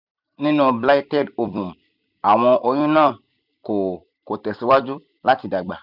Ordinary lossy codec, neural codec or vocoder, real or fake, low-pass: none; vocoder, 44.1 kHz, 128 mel bands every 256 samples, BigVGAN v2; fake; 5.4 kHz